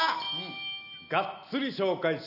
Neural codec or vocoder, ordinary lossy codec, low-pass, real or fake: none; none; 5.4 kHz; real